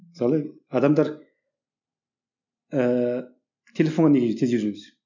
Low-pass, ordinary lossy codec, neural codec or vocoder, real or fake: 7.2 kHz; none; none; real